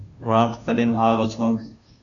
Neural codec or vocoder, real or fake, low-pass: codec, 16 kHz, 0.5 kbps, FunCodec, trained on Chinese and English, 25 frames a second; fake; 7.2 kHz